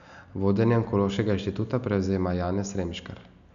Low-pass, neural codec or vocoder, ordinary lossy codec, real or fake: 7.2 kHz; none; none; real